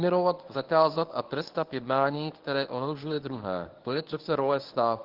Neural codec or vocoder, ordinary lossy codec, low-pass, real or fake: codec, 24 kHz, 0.9 kbps, WavTokenizer, medium speech release version 1; Opus, 16 kbps; 5.4 kHz; fake